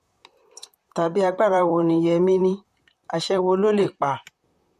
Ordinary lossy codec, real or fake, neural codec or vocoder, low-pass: MP3, 64 kbps; fake; vocoder, 44.1 kHz, 128 mel bands, Pupu-Vocoder; 14.4 kHz